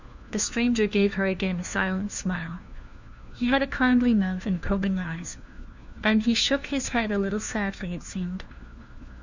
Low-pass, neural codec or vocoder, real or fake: 7.2 kHz; codec, 16 kHz, 1 kbps, FunCodec, trained on LibriTTS, 50 frames a second; fake